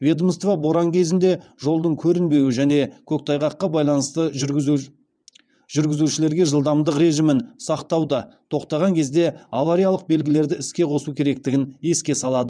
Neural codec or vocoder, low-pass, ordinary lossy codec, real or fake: vocoder, 22.05 kHz, 80 mel bands, WaveNeXt; 9.9 kHz; none; fake